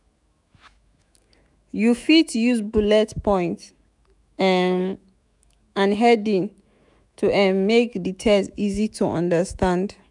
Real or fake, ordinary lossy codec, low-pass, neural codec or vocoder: fake; none; 10.8 kHz; autoencoder, 48 kHz, 128 numbers a frame, DAC-VAE, trained on Japanese speech